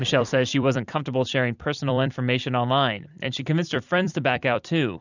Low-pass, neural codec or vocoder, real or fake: 7.2 kHz; vocoder, 44.1 kHz, 128 mel bands every 256 samples, BigVGAN v2; fake